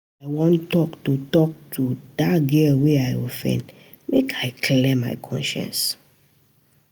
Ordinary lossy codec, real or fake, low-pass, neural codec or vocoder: none; real; none; none